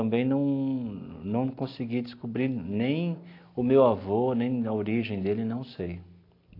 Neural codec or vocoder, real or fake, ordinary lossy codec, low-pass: none; real; AAC, 32 kbps; 5.4 kHz